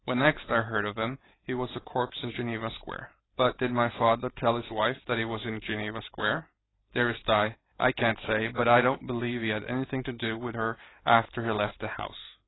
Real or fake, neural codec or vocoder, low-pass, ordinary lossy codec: fake; vocoder, 44.1 kHz, 128 mel bands every 512 samples, BigVGAN v2; 7.2 kHz; AAC, 16 kbps